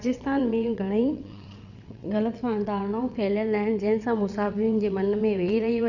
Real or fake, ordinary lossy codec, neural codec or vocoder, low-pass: fake; none; vocoder, 22.05 kHz, 80 mel bands, WaveNeXt; 7.2 kHz